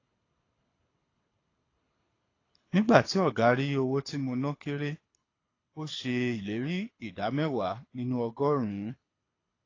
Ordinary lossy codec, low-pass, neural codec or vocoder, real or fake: AAC, 32 kbps; 7.2 kHz; codec, 24 kHz, 6 kbps, HILCodec; fake